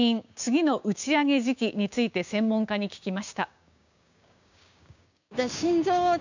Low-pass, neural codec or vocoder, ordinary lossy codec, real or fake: 7.2 kHz; codec, 16 kHz, 6 kbps, DAC; none; fake